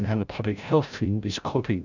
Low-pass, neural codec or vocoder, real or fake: 7.2 kHz; codec, 16 kHz, 0.5 kbps, FreqCodec, larger model; fake